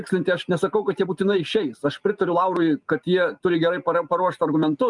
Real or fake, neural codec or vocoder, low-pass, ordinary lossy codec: real; none; 10.8 kHz; Opus, 32 kbps